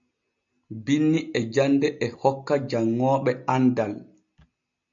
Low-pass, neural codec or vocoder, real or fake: 7.2 kHz; none; real